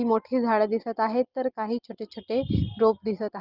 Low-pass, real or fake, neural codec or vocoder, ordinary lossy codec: 5.4 kHz; real; none; Opus, 32 kbps